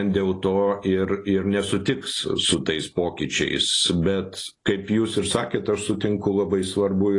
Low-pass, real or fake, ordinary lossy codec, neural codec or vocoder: 10.8 kHz; real; AAC, 32 kbps; none